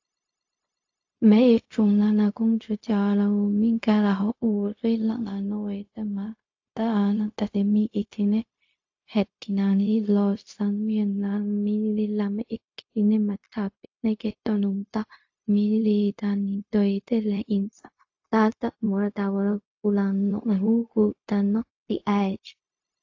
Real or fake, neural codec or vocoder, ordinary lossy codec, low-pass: fake; codec, 16 kHz, 0.4 kbps, LongCat-Audio-Codec; AAC, 48 kbps; 7.2 kHz